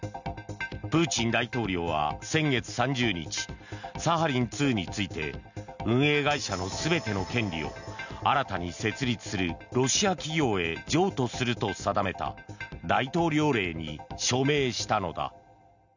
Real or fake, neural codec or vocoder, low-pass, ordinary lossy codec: real; none; 7.2 kHz; none